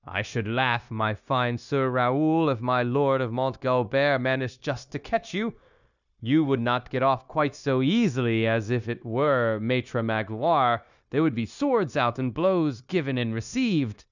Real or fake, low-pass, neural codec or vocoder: fake; 7.2 kHz; codec, 16 kHz, 0.9 kbps, LongCat-Audio-Codec